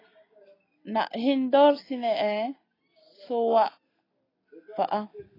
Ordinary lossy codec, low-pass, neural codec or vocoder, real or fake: AAC, 24 kbps; 5.4 kHz; none; real